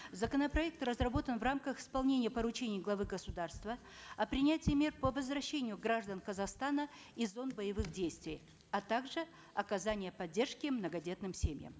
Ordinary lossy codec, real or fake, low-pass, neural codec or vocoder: none; real; none; none